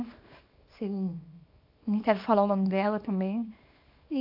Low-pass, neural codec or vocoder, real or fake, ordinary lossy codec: 5.4 kHz; codec, 24 kHz, 0.9 kbps, WavTokenizer, small release; fake; AAC, 48 kbps